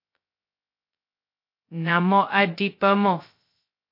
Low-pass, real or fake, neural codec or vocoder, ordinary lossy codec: 5.4 kHz; fake; codec, 16 kHz, 0.2 kbps, FocalCodec; MP3, 32 kbps